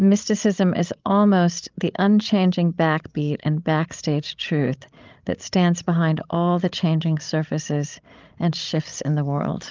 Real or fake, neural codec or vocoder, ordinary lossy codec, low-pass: fake; codec, 16 kHz, 16 kbps, FreqCodec, larger model; Opus, 24 kbps; 7.2 kHz